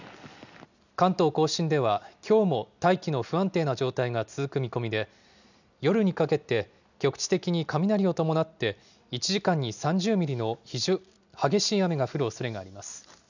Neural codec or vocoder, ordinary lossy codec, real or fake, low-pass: none; none; real; 7.2 kHz